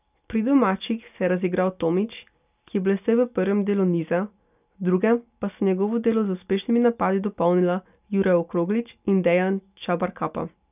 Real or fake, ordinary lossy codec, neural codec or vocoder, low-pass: real; none; none; 3.6 kHz